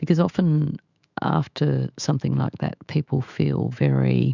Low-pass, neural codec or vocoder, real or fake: 7.2 kHz; autoencoder, 48 kHz, 128 numbers a frame, DAC-VAE, trained on Japanese speech; fake